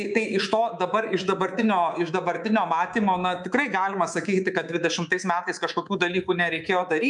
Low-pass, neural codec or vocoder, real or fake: 10.8 kHz; codec, 24 kHz, 3.1 kbps, DualCodec; fake